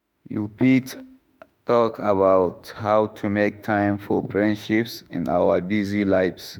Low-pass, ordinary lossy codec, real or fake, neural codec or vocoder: none; none; fake; autoencoder, 48 kHz, 32 numbers a frame, DAC-VAE, trained on Japanese speech